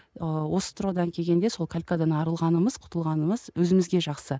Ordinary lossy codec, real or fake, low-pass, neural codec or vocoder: none; real; none; none